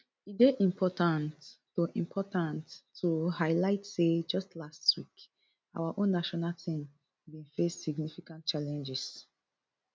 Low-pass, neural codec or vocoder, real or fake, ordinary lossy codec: none; none; real; none